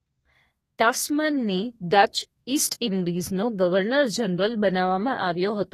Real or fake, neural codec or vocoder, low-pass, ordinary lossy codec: fake; codec, 32 kHz, 1.9 kbps, SNAC; 14.4 kHz; AAC, 48 kbps